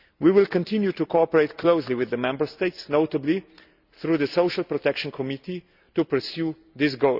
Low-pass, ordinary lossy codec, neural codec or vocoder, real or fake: 5.4 kHz; Opus, 64 kbps; none; real